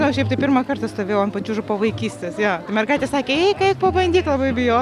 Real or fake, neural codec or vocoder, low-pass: real; none; 14.4 kHz